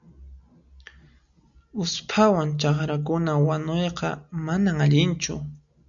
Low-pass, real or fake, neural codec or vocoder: 7.2 kHz; real; none